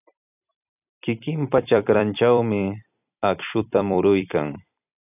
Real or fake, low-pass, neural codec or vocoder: fake; 3.6 kHz; vocoder, 44.1 kHz, 128 mel bands every 256 samples, BigVGAN v2